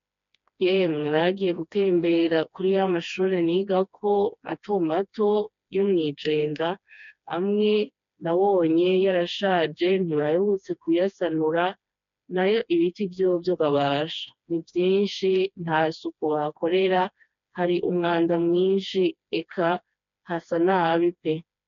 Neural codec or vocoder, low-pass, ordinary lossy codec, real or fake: codec, 16 kHz, 2 kbps, FreqCodec, smaller model; 7.2 kHz; MP3, 64 kbps; fake